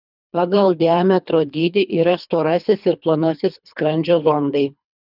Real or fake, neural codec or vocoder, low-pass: fake; codec, 24 kHz, 3 kbps, HILCodec; 5.4 kHz